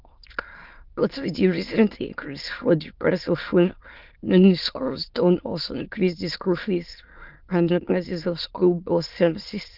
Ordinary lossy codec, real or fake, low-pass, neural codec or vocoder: Opus, 24 kbps; fake; 5.4 kHz; autoencoder, 22.05 kHz, a latent of 192 numbers a frame, VITS, trained on many speakers